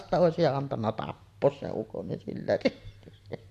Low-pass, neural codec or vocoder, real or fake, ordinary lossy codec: 14.4 kHz; none; real; AAC, 64 kbps